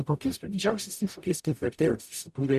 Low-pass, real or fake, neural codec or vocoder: 14.4 kHz; fake; codec, 44.1 kHz, 0.9 kbps, DAC